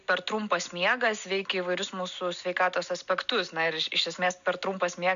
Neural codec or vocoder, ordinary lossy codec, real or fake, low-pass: none; MP3, 96 kbps; real; 7.2 kHz